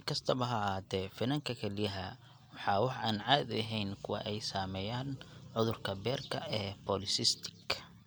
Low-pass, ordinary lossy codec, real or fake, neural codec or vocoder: none; none; real; none